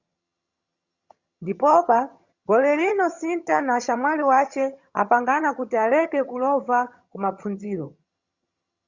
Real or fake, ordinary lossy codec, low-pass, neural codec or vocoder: fake; Opus, 64 kbps; 7.2 kHz; vocoder, 22.05 kHz, 80 mel bands, HiFi-GAN